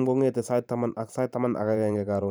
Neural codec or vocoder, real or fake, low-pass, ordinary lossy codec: vocoder, 44.1 kHz, 128 mel bands every 512 samples, BigVGAN v2; fake; none; none